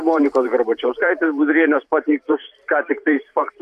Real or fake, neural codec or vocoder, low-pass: fake; vocoder, 44.1 kHz, 128 mel bands every 512 samples, BigVGAN v2; 14.4 kHz